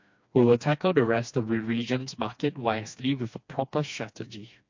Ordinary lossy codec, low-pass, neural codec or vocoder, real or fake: MP3, 48 kbps; 7.2 kHz; codec, 16 kHz, 2 kbps, FreqCodec, smaller model; fake